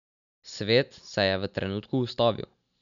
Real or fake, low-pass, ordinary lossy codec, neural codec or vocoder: real; 7.2 kHz; none; none